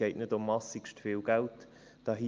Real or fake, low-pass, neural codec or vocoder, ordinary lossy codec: real; 7.2 kHz; none; Opus, 24 kbps